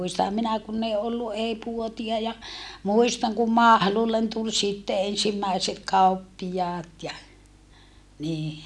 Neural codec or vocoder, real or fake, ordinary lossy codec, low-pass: none; real; none; none